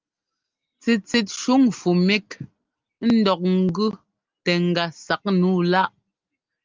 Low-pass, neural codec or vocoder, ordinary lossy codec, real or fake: 7.2 kHz; none; Opus, 24 kbps; real